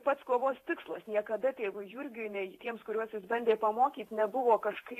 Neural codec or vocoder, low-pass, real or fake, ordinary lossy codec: vocoder, 48 kHz, 128 mel bands, Vocos; 14.4 kHz; fake; AAC, 48 kbps